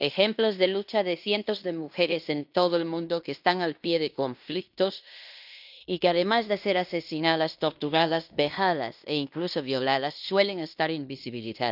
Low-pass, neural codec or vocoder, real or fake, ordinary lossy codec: 5.4 kHz; codec, 16 kHz in and 24 kHz out, 0.9 kbps, LongCat-Audio-Codec, fine tuned four codebook decoder; fake; none